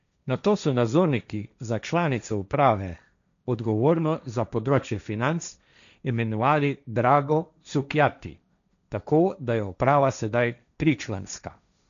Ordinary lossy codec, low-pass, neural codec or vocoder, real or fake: none; 7.2 kHz; codec, 16 kHz, 1.1 kbps, Voila-Tokenizer; fake